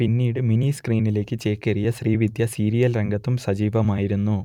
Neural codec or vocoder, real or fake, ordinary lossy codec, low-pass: vocoder, 44.1 kHz, 128 mel bands every 256 samples, BigVGAN v2; fake; none; 19.8 kHz